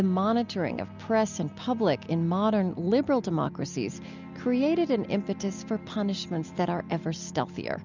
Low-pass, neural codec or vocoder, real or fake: 7.2 kHz; none; real